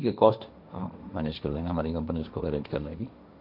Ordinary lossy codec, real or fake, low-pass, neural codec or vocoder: none; fake; 5.4 kHz; codec, 16 kHz, 1.1 kbps, Voila-Tokenizer